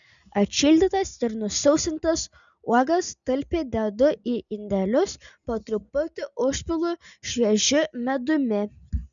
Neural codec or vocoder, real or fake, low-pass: none; real; 7.2 kHz